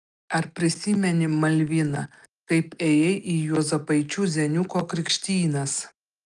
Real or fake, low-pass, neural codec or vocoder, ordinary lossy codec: real; 10.8 kHz; none; Opus, 32 kbps